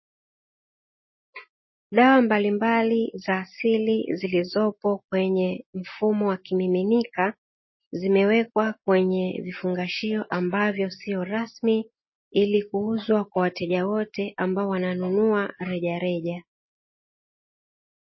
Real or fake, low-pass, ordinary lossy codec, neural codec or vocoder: real; 7.2 kHz; MP3, 24 kbps; none